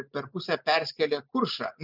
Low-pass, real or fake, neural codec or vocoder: 5.4 kHz; real; none